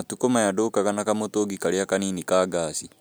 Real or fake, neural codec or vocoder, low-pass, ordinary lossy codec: real; none; none; none